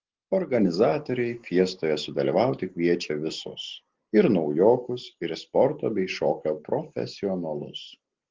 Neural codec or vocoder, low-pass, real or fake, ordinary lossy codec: none; 7.2 kHz; real; Opus, 16 kbps